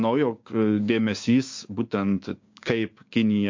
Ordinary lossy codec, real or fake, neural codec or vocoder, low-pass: MP3, 48 kbps; fake; codec, 16 kHz, 6 kbps, DAC; 7.2 kHz